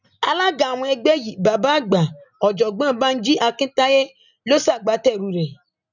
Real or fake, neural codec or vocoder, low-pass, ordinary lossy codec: real; none; 7.2 kHz; none